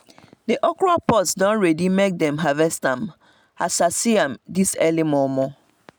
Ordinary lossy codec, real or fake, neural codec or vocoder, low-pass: none; real; none; none